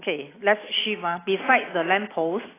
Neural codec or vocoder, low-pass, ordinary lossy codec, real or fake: none; 3.6 kHz; AAC, 16 kbps; real